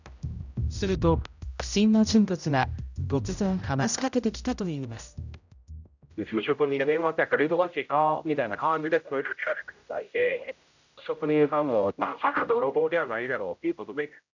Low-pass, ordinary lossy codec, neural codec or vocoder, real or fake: 7.2 kHz; none; codec, 16 kHz, 0.5 kbps, X-Codec, HuBERT features, trained on general audio; fake